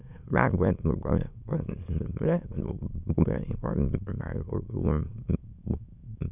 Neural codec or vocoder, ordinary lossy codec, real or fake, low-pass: autoencoder, 22.05 kHz, a latent of 192 numbers a frame, VITS, trained on many speakers; none; fake; 3.6 kHz